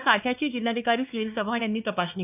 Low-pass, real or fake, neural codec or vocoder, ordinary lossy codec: 3.6 kHz; fake; codec, 16 kHz, 2 kbps, X-Codec, WavLM features, trained on Multilingual LibriSpeech; none